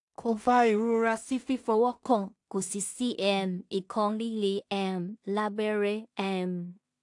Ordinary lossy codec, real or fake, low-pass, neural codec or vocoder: AAC, 64 kbps; fake; 10.8 kHz; codec, 16 kHz in and 24 kHz out, 0.4 kbps, LongCat-Audio-Codec, two codebook decoder